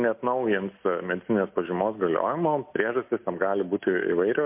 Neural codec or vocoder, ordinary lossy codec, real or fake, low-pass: none; MP3, 32 kbps; real; 3.6 kHz